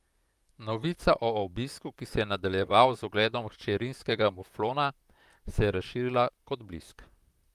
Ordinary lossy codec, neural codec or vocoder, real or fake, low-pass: Opus, 32 kbps; vocoder, 44.1 kHz, 128 mel bands, Pupu-Vocoder; fake; 14.4 kHz